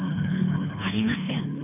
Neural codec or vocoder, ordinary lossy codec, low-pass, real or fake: codec, 24 kHz, 3 kbps, HILCodec; none; 3.6 kHz; fake